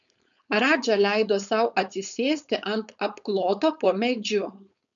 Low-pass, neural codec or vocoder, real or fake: 7.2 kHz; codec, 16 kHz, 4.8 kbps, FACodec; fake